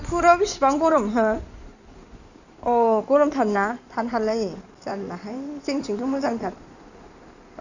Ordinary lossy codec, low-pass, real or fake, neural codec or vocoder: none; 7.2 kHz; fake; codec, 16 kHz in and 24 kHz out, 2.2 kbps, FireRedTTS-2 codec